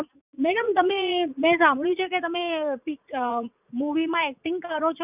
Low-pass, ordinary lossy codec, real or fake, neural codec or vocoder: 3.6 kHz; none; fake; vocoder, 44.1 kHz, 80 mel bands, Vocos